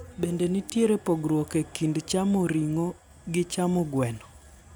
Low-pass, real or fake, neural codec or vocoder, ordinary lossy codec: none; real; none; none